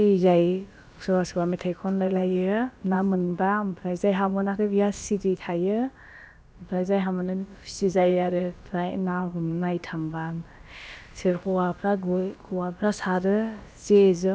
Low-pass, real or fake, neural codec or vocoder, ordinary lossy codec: none; fake; codec, 16 kHz, about 1 kbps, DyCAST, with the encoder's durations; none